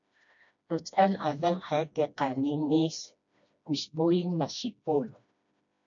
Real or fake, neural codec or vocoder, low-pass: fake; codec, 16 kHz, 1 kbps, FreqCodec, smaller model; 7.2 kHz